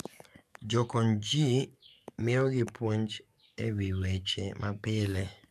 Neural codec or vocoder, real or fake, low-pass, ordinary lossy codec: codec, 44.1 kHz, 7.8 kbps, DAC; fake; 14.4 kHz; none